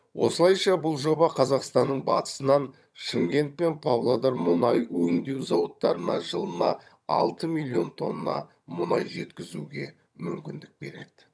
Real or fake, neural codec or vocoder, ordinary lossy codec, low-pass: fake; vocoder, 22.05 kHz, 80 mel bands, HiFi-GAN; none; none